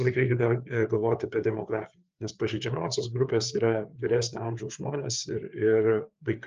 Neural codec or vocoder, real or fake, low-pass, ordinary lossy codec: codec, 16 kHz, 4 kbps, FreqCodec, larger model; fake; 7.2 kHz; Opus, 24 kbps